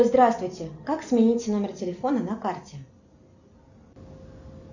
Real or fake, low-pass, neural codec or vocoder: real; 7.2 kHz; none